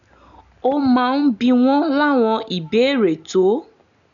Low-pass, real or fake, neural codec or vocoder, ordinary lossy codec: 7.2 kHz; real; none; none